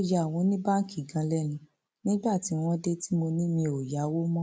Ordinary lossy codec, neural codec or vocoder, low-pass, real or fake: none; none; none; real